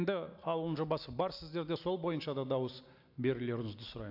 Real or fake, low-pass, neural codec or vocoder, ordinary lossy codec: real; 5.4 kHz; none; AAC, 48 kbps